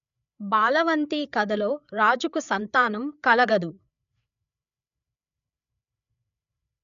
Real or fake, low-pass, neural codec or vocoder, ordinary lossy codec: fake; 7.2 kHz; codec, 16 kHz, 8 kbps, FreqCodec, larger model; none